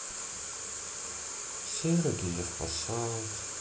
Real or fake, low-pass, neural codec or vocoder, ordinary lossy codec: real; none; none; none